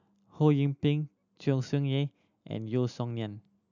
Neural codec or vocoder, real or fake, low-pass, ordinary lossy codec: none; real; 7.2 kHz; none